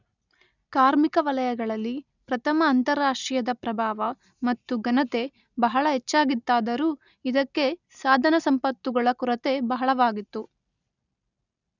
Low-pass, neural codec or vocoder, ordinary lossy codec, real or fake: 7.2 kHz; none; none; real